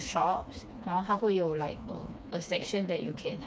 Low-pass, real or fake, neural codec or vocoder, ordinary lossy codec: none; fake; codec, 16 kHz, 2 kbps, FreqCodec, smaller model; none